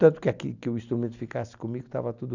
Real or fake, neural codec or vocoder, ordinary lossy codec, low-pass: real; none; none; 7.2 kHz